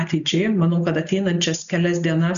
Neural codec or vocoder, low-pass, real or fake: none; 7.2 kHz; real